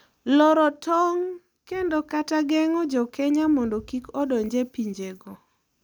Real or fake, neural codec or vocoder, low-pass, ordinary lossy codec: real; none; none; none